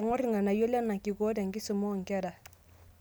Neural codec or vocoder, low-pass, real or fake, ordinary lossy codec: none; none; real; none